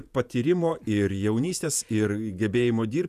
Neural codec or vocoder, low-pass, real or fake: vocoder, 48 kHz, 128 mel bands, Vocos; 14.4 kHz; fake